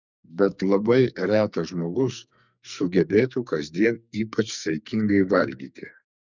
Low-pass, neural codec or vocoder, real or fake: 7.2 kHz; codec, 44.1 kHz, 2.6 kbps, SNAC; fake